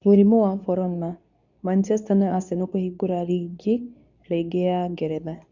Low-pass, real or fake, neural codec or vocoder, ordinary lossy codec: 7.2 kHz; fake; codec, 24 kHz, 0.9 kbps, WavTokenizer, medium speech release version 2; none